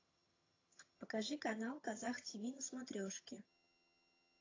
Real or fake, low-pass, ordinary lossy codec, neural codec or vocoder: fake; 7.2 kHz; AAC, 32 kbps; vocoder, 22.05 kHz, 80 mel bands, HiFi-GAN